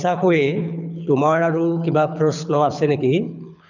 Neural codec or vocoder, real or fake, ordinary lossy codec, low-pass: codec, 24 kHz, 6 kbps, HILCodec; fake; none; 7.2 kHz